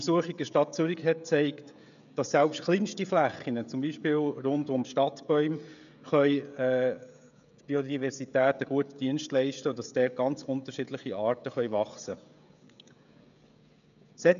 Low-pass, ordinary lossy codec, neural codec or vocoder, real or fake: 7.2 kHz; none; codec, 16 kHz, 16 kbps, FreqCodec, smaller model; fake